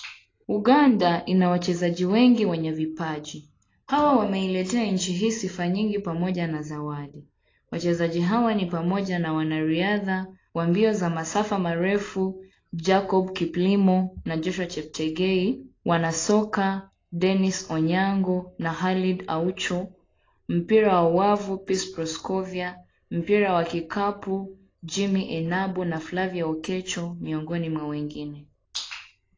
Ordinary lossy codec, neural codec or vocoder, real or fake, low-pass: AAC, 32 kbps; none; real; 7.2 kHz